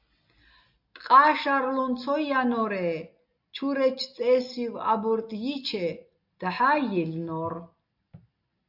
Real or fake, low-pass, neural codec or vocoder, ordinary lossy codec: real; 5.4 kHz; none; AAC, 48 kbps